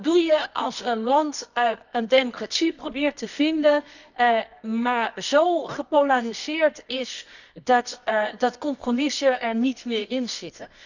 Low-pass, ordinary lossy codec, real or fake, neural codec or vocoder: 7.2 kHz; none; fake; codec, 24 kHz, 0.9 kbps, WavTokenizer, medium music audio release